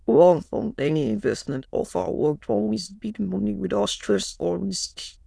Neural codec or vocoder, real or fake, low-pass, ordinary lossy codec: autoencoder, 22.05 kHz, a latent of 192 numbers a frame, VITS, trained on many speakers; fake; none; none